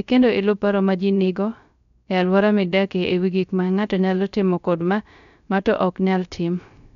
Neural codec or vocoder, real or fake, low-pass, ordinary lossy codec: codec, 16 kHz, 0.3 kbps, FocalCodec; fake; 7.2 kHz; none